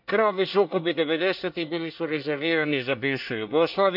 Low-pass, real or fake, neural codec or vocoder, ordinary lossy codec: 5.4 kHz; fake; codec, 24 kHz, 1 kbps, SNAC; none